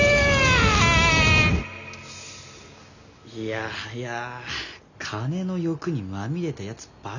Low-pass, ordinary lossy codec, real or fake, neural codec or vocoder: 7.2 kHz; none; real; none